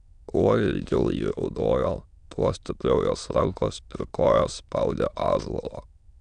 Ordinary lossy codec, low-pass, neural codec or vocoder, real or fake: MP3, 96 kbps; 9.9 kHz; autoencoder, 22.05 kHz, a latent of 192 numbers a frame, VITS, trained on many speakers; fake